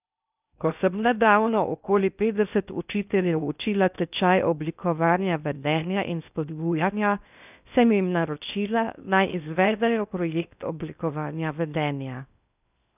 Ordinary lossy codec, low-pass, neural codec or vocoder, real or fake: none; 3.6 kHz; codec, 16 kHz in and 24 kHz out, 0.6 kbps, FocalCodec, streaming, 2048 codes; fake